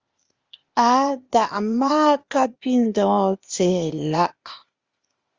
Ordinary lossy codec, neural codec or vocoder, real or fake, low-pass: Opus, 32 kbps; codec, 16 kHz, 0.8 kbps, ZipCodec; fake; 7.2 kHz